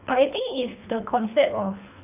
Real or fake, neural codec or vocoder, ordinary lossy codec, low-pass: fake; codec, 24 kHz, 3 kbps, HILCodec; none; 3.6 kHz